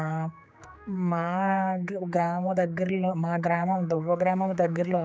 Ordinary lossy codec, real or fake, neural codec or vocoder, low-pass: none; fake; codec, 16 kHz, 4 kbps, X-Codec, HuBERT features, trained on general audio; none